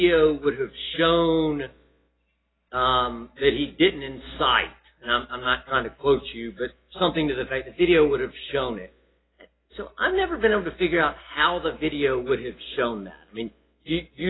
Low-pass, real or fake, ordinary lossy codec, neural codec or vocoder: 7.2 kHz; real; AAC, 16 kbps; none